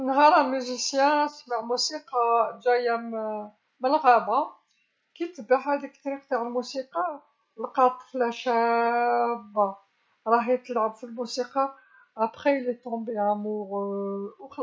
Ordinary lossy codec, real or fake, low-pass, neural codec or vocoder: none; real; none; none